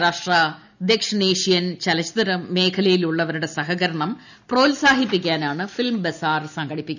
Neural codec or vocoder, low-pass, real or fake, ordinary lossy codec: none; 7.2 kHz; real; none